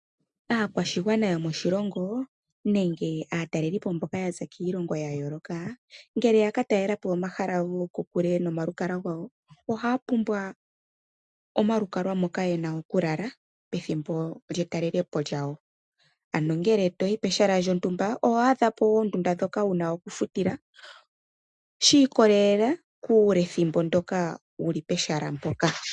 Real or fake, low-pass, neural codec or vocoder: real; 10.8 kHz; none